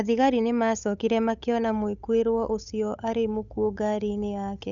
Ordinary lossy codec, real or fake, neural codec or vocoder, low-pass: none; fake; codec, 16 kHz, 8 kbps, FunCodec, trained on Chinese and English, 25 frames a second; 7.2 kHz